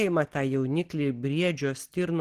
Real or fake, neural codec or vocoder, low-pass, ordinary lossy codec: real; none; 14.4 kHz; Opus, 16 kbps